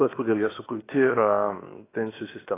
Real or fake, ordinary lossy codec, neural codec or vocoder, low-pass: fake; AAC, 16 kbps; codec, 16 kHz, 0.7 kbps, FocalCodec; 3.6 kHz